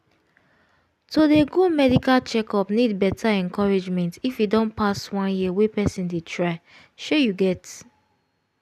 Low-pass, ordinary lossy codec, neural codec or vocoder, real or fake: 14.4 kHz; none; none; real